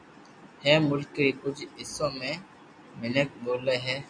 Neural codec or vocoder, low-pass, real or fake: none; 9.9 kHz; real